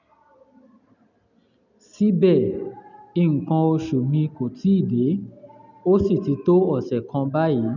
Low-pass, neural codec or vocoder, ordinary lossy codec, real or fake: 7.2 kHz; none; none; real